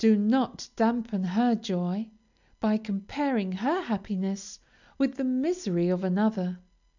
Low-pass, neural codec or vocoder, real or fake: 7.2 kHz; none; real